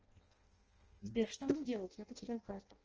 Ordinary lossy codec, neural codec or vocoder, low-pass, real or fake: Opus, 24 kbps; codec, 16 kHz in and 24 kHz out, 0.6 kbps, FireRedTTS-2 codec; 7.2 kHz; fake